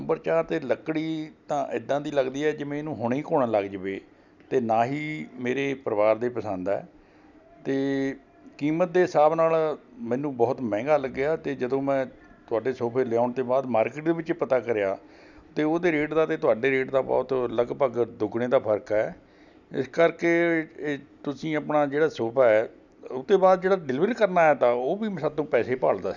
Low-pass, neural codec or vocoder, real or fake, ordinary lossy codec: 7.2 kHz; none; real; none